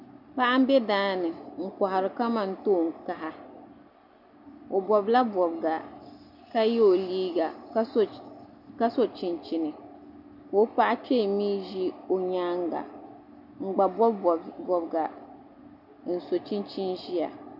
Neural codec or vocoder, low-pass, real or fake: none; 5.4 kHz; real